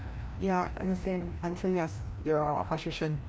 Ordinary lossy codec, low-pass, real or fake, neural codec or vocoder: none; none; fake; codec, 16 kHz, 1 kbps, FreqCodec, larger model